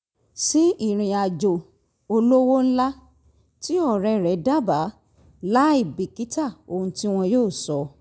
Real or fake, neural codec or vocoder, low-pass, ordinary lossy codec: real; none; none; none